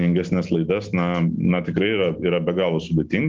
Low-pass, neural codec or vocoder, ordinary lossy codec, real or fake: 7.2 kHz; none; Opus, 32 kbps; real